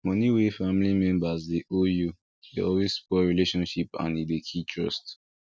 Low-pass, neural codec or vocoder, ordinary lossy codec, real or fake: none; none; none; real